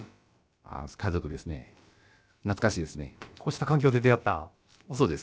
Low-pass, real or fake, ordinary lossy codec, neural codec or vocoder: none; fake; none; codec, 16 kHz, about 1 kbps, DyCAST, with the encoder's durations